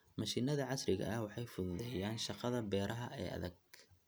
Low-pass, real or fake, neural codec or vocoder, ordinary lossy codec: none; real; none; none